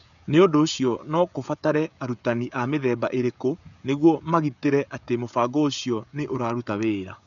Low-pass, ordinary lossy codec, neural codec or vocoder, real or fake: 7.2 kHz; none; codec, 16 kHz, 16 kbps, FreqCodec, smaller model; fake